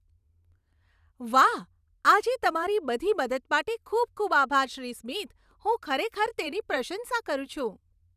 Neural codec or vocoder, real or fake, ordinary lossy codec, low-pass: vocoder, 44.1 kHz, 128 mel bands every 512 samples, BigVGAN v2; fake; none; 14.4 kHz